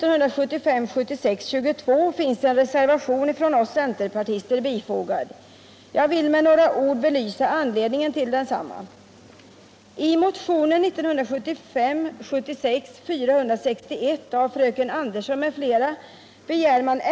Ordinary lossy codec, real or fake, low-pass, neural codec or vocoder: none; real; none; none